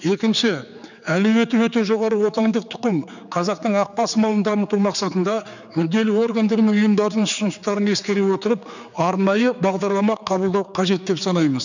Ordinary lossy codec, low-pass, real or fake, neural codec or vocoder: none; 7.2 kHz; fake; codec, 16 kHz, 4 kbps, X-Codec, HuBERT features, trained on general audio